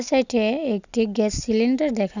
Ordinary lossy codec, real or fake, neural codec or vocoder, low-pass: none; real; none; 7.2 kHz